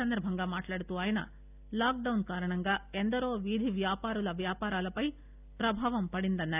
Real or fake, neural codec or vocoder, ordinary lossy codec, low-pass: real; none; none; 3.6 kHz